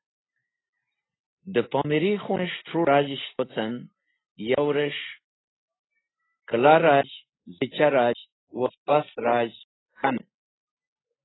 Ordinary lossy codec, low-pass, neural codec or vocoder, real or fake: AAC, 16 kbps; 7.2 kHz; none; real